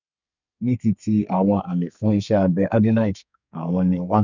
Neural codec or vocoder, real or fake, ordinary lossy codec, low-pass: codec, 32 kHz, 1.9 kbps, SNAC; fake; none; 7.2 kHz